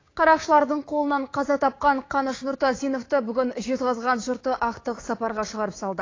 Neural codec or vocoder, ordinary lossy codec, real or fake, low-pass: codec, 16 kHz, 6 kbps, DAC; AAC, 32 kbps; fake; 7.2 kHz